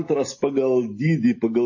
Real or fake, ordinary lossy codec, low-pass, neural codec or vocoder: real; MP3, 32 kbps; 7.2 kHz; none